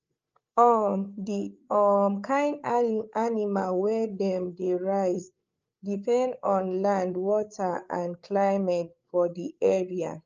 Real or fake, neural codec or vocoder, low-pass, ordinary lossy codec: fake; codec, 16 kHz, 8 kbps, FreqCodec, larger model; 7.2 kHz; Opus, 24 kbps